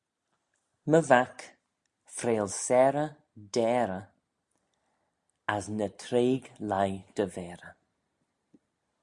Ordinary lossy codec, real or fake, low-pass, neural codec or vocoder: Opus, 64 kbps; real; 10.8 kHz; none